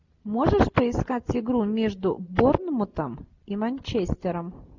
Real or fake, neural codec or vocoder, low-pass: real; none; 7.2 kHz